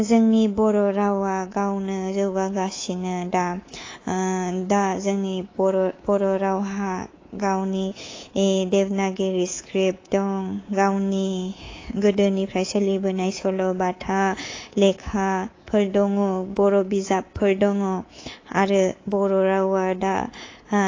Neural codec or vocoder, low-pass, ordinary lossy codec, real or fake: codec, 24 kHz, 3.1 kbps, DualCodec; 7.2 kHz; AAC, 32 kbps; fake